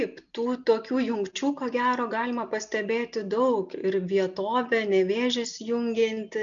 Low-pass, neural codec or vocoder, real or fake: 7.2 kHz; none; real